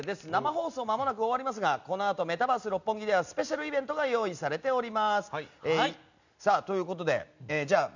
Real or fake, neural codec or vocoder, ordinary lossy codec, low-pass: real; none; none; 7.2 kHz